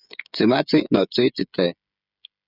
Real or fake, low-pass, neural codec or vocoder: fake; 5.4 kHz; codec, 16 kHz, 16 kbps, FreqCodec, smaller model